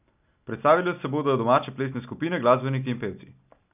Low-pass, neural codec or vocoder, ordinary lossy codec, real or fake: 3.6 kHz; none; none; real